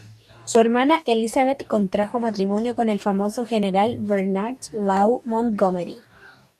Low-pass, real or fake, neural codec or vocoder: 14.4 kHz; fake; codec, 44.1 kHz, 2.6 kbps, DAC